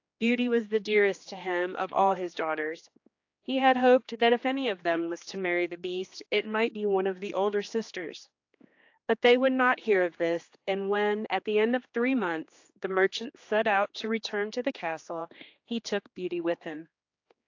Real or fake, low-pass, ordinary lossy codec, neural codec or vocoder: fake; 7.2 kHz; AAC, 48 kbps; codec, 16 kHz, 2 kbps, X-Codec, HuBERT features, trained on general audio